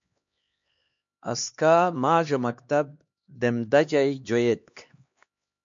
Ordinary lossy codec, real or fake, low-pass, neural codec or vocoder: MP3, 48 kbps; fake; 7.2 kHz; codec, 16 kHz, 2 kbps, X-Codec, HuBERT features, trained on LibriSpeech